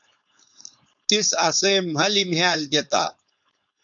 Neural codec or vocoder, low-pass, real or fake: codec, 16 kHz, 4.8 kbps, FACodec; 7.2 kHz; fake